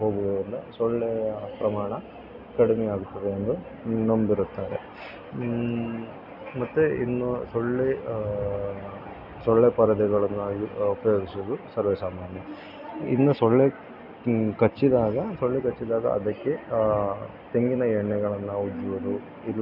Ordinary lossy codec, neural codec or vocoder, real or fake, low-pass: none; none; real; 5.4 kHz